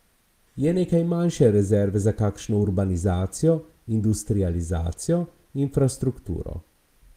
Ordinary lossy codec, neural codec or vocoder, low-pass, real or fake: Opus, 24 kbps; none; 14.4 kHz; real